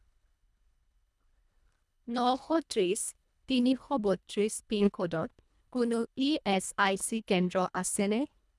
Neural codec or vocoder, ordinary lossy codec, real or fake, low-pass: codec, 24 kHz, 1.5 kbps, HILCodec; none; fake; none